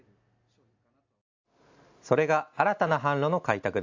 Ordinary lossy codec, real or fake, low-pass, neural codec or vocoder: AAC, 48 kbps; real; 7.2 kHz; none